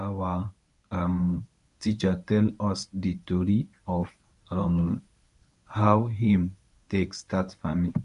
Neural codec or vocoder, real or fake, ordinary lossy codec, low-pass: codec, 24 kHz, 0.9 kbps, WavTokenizer, medium speech release version 1; fake; none; 10.8 kHz